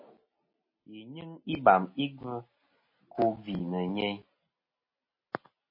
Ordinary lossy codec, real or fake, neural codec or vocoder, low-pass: MP3, 24 kbps; real; none; 5.4 kHz